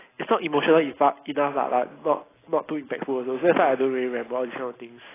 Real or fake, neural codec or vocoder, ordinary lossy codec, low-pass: real; none; AAC, 16 kbps; 3.6 kHz